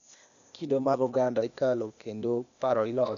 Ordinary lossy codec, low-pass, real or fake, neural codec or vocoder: none; 7.2 kHz; fake; codec, 16 kHz, 0.8 kbps, ZipCodec